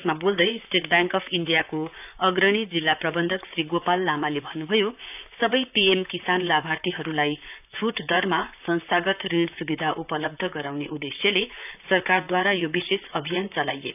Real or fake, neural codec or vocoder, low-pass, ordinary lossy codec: fake; vocoder, 44.1 kHz, 128 mel bands, Pupu-Vocoder; 3.6 kHz; none